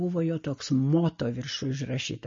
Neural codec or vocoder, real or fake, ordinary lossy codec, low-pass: none; real; MP3, 32 kbps; 7.2 kHz